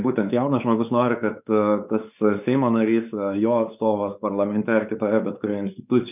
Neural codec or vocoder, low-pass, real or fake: codec, 16 kHz, 4 kbps, X-Codec, WavLM features, trained on Multilingual LibriSpeech; 3.6 kHz; fake